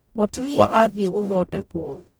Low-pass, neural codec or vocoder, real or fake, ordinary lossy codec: none; codec, 44.1 kHz, 0.9 kbps, DAC; fake; none